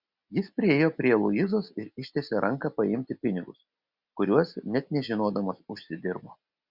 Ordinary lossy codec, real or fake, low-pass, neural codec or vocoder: Opus, 64 kbps; real; 5.4 kHz; none